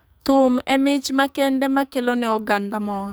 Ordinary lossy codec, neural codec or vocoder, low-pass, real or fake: none; codec, 44.1 kHz, 2.6 kbps, SNAC; none; fake